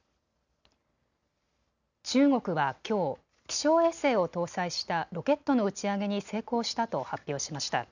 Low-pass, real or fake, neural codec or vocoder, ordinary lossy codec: 7.2 kHz; real; none; none